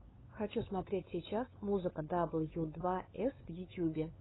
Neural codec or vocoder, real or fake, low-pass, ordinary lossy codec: codec, 16 kHz, 8 kbps, FunCodec, trained on LibriTTS, 25 frames a second; fake; 7.2 kHz; AAC, 16 kbps